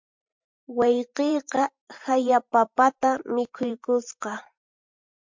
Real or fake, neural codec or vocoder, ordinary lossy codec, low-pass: fake; vocoder, 44.1 kHz, 128 mel bands every 512 samples, BigVGAN v2; MP3, 64 kbps; 7.2 kHz